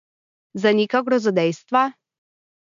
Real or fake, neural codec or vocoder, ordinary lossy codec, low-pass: real; none; MP3, 64 kbps; 7.2 kHz